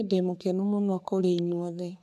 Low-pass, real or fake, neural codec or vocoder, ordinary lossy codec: 14.4 kHz; fake; codec, 44.1 kHz, 3.4 kbps, Pupu-Codec; none